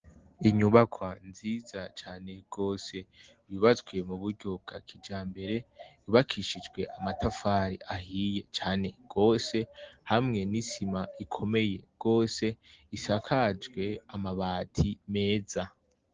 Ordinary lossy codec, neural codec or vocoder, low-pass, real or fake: Opus, 16 kbps; none; 7.2 kHz; real